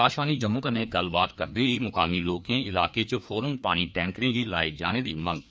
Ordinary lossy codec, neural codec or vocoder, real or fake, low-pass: none; codec, 16 kHz, 2 kbps, FreqCodec, larger model; fake; none